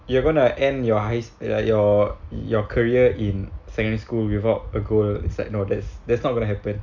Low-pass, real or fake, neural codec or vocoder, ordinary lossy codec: 7.2 kHz; real; none; none